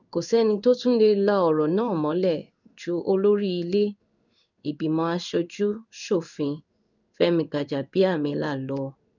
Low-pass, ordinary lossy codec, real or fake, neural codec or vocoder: 7.2 kHz; none; fake; codec, 16 kHz in and 24 kHz out, 1 kbps, XY-Tokenizer